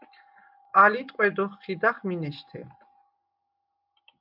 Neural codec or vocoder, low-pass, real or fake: none; 5.4 kHz; real